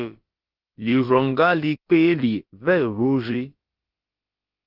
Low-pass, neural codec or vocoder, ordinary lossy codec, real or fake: 5.4 kHz; codec, 16 kHz, about 1 kbps, DyCAST, with the encoder's durations; Opus, 32 kbps; fake